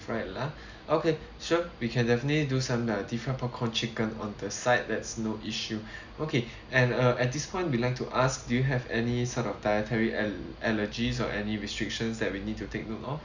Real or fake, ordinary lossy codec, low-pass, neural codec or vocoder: real; Opus, 64 kbps; 7.2 kHz; none